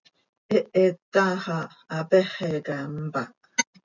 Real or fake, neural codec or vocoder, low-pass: real; none; 7.2 kHz